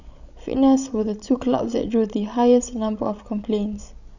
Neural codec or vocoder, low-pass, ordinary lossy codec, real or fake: codec, 16 kHz, 16 kbps, FreqCodec, larger model; 7.2 kHz; none; fake